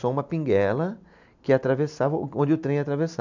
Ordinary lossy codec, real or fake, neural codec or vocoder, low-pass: none; real; none; 7.2 kHz